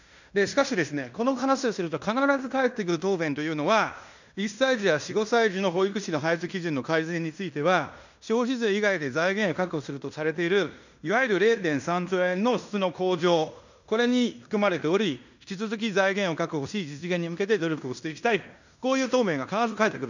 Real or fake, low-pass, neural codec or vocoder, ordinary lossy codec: fake; 7.2 kHz; codec, 16 kHz in and 24 kHz out, 0.9 kbps, LongCat-Audio-Codec, fine tuned four codebook decoder; none